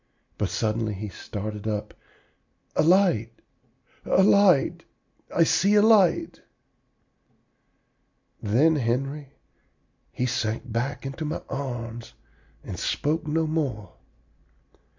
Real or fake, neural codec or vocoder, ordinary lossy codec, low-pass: real; none; MP3, 64 kbps; 7.2 kHz